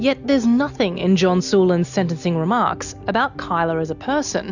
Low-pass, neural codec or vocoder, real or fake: 7.2 kHz; none; real